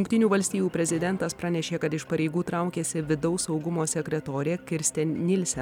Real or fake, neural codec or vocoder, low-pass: real; none; 19.8 kHz